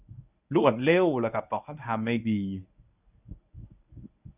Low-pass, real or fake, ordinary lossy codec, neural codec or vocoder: 3.6 kHz; fake; none; codec, 24 kHz, 0.9 kbps, WavTokenizer, medium speech release version 1